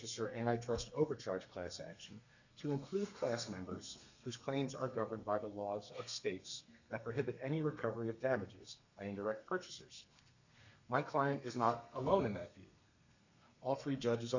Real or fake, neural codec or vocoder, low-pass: fake; codec, 32 kHz, 1.9 kbps, SNAC; 7.2 kHz